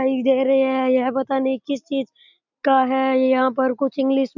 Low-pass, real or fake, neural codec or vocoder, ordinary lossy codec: 7.2 kHz; real; none; none